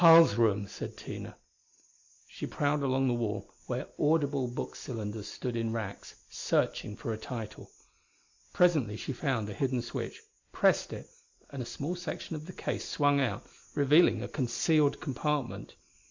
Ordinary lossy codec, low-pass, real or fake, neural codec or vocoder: MP3, 64 kbps; 7.2 kHz; real; none